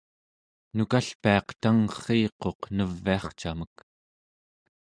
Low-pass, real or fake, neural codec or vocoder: 9.9 kHz; real; none